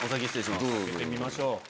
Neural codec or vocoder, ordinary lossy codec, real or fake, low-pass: none; none; real; none